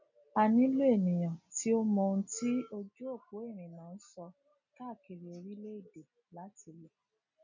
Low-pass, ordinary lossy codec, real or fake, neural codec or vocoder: 7.2 kHz; none; real; none